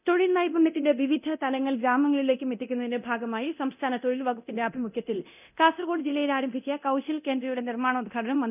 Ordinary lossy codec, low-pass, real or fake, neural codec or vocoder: none; 3.6 kHz; fake; codec, 24 kHz, 0.9 kbps, DualCodec